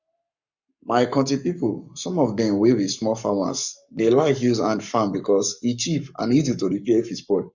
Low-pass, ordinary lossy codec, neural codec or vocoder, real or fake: 7.2 kHz; none; codec, 44.1 kHz, 7.8 kbps, Pupu-Codec; fake